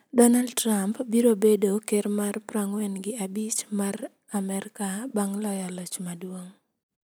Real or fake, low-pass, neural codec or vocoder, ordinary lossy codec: real; none; none; none